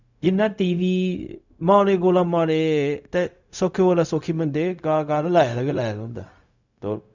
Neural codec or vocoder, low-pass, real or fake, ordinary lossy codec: codec, 16 kHz, 0.4 kbps, LongCat-Audio-Codec; 7.2 kHz; fake; none